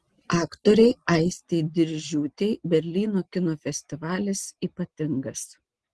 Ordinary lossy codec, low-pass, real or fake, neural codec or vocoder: Opus, 16 kbps; 10.8 kHz; real; none